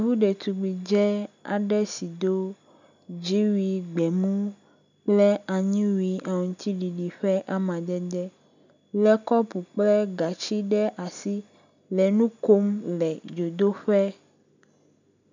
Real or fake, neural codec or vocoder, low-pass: real; none; 7.2 kHz